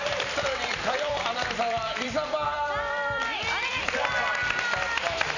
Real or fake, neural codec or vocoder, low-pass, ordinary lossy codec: fake; vocoder, 44.1 kHz, 128 mel bands every 256 samples, BigVGAN v2; 7.2 kHz; none